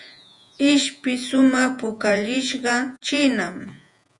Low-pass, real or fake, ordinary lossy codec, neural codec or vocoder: 10.8 kHz; fake; MP3, 96 kbps; vocoder, 48 kHz, 128 mel bands, Vocos